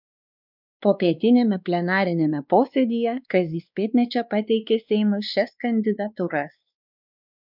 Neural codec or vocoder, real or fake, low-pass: codec, 16 kHz, 2 kbps, X-Codec, WavLM features, trained on Multilingual LibriSpeech; fake; 5.4 kHz